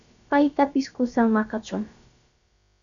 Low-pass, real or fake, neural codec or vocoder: 7.2 kHz; fake; codec, 16 kHz, about 1 kbps, DyCAST, with the encoder's durations